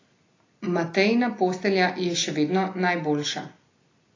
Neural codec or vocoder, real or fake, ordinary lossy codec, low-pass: none; real; AAC, 32 kbps; 7.2 kHz